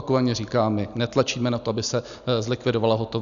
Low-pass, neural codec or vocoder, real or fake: 7.2 kHz; none; real